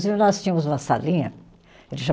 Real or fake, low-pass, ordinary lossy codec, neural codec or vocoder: real; none; none; none